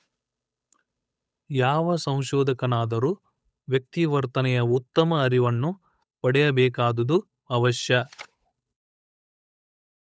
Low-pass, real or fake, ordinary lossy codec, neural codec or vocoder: none; fake; none; codec, 16 kHz, 8 kbps, FunCodec, trained on Chinese and English, 25 frames a second